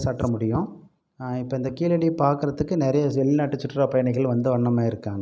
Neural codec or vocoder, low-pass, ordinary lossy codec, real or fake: none; none; none; real